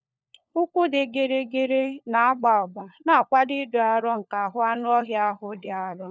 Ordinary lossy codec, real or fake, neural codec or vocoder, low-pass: none; fake; codec, 16 kHz, 4 kbps, FunCodec, trained on LibriTTS, 50 frames a second; none